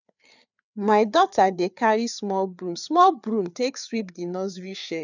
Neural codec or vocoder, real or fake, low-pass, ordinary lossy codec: codec, 16 kHz, 4 kbps, FreqCodec, larger model; fake; 7.2 kHz; none